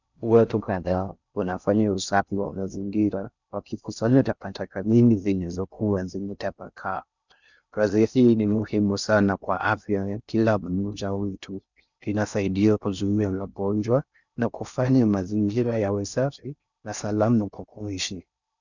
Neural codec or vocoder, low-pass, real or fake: codec, 16 kHz in and 24 kHz out, 0.6 kbps, FocalCodec, streaming, 4096 codes; 7.2 kHz; fake